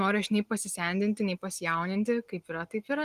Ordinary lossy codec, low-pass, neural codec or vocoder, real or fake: Opus, 24 kbps; 14.4 kHz; none; real